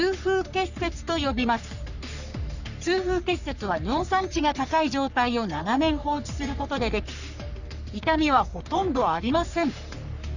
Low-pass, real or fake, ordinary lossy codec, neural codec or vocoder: 7.2 kHz; fake; none; codec, 44.1 kHz, 3.4 kbps, Pupu-Codec